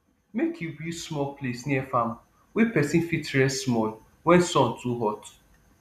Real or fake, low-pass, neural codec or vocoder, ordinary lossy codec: real; 14.4 kHz; none; none